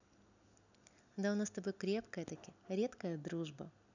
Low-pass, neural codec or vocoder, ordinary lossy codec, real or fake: 7.2 kHz; none; none; real